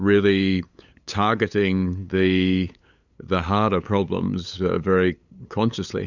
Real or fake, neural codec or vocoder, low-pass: fake; codec, 16 kHz, 8 kbps, FunCodec, trained on LibriTTS, 25 frames a second; 7.2 kHz